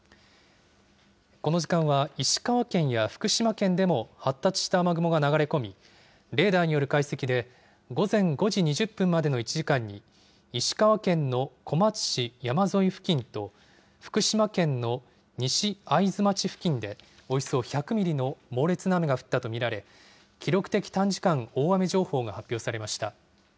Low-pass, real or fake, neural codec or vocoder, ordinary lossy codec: none; real; none; none